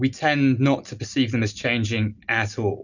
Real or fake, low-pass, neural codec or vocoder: real; 7.2 kHz; none